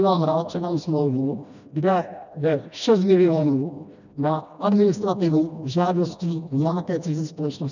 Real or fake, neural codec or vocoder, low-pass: fake; codec, 16 kHz, 1 kbps, FreqCodec, smaller model; 7.2 kHz